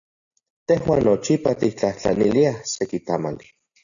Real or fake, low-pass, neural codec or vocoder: real; 7.2 kHz; none